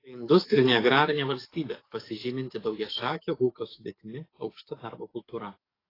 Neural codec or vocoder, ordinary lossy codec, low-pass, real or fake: codec, 44.1 kHz, 7.8 kbps, DAC; AAC, 24 kbps; 5.4 kHz; fake